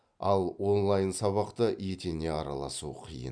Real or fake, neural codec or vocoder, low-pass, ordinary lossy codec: real; none; 9.9 kHz; none